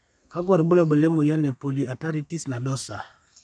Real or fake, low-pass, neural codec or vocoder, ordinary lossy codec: fake; 9.9 kHz; codec, 32 kHz, 1.9 kbps, SNAC; none